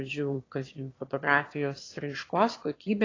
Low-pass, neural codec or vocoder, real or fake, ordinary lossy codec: 7.2 kHz; autoencoder, 22.05 kHz, a latent of 192 numbers a frame, VITS, trained on one speaker; fake; AAC, 32 kbps